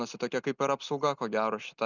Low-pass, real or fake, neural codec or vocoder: 7.2 kHz; real; none